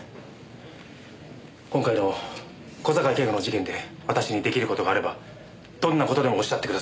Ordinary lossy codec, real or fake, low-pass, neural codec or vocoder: none; real; none; none